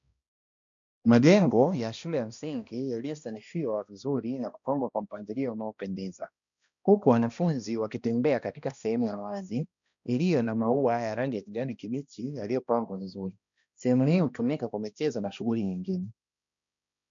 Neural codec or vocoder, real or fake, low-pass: codec, 16 kHz, 1 kbps, X-Codec, HuBERT features, trained on balanced general audio; fake; 7.2 kHz